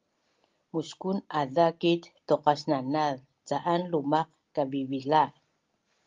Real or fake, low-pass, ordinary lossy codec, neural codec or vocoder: real; 7.2 kHz; Opus, 32 kbps; none